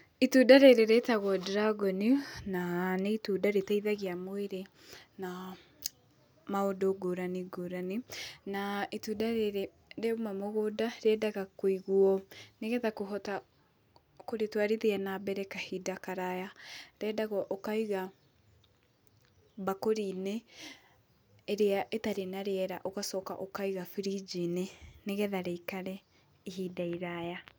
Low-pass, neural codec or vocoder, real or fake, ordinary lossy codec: none; none; real; none